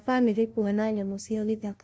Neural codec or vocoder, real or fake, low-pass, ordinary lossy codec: codec, 16 kHz, 0.5 kbps, FunCodec, trained on LibriTTS, 25 frames a second; fake; none; none